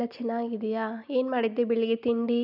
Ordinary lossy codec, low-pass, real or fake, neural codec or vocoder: none; 5.4 kHz; real; none